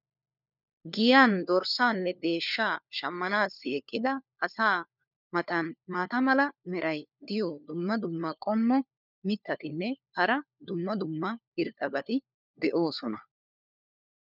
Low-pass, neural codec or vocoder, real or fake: 5.4 kHz; codec, 16 kHz, 4 kbps, FunCodec, trained on LibriTTS, 50 frames a second; fake